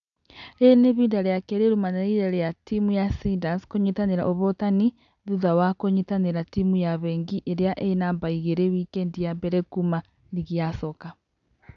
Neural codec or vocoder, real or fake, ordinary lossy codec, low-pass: none; real; none; 7.2 kHz